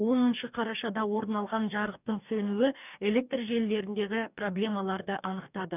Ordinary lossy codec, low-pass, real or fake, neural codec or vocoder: none; 3.6 kHz; fake; codec, 44.1 kHz, 2.6 kbps, DAC